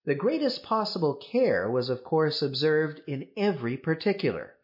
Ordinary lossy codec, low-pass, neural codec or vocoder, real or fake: MP3, 32 kbps; 5.4 kHz; none; real